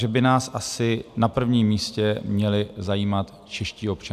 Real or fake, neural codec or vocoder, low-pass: real; none; 14.4 kHz